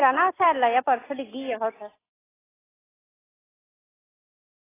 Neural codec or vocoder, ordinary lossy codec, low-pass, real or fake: none; AAC, 16 kbps; 3.6 kHz; real